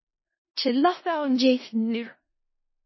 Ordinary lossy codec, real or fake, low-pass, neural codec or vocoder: MP3, 24 kbps; fake; 7.2 kHz; codec, 16 kHz in and 24 kHz out, 0.4 kbps, LongCat-Audio-Codec, four codebook decoder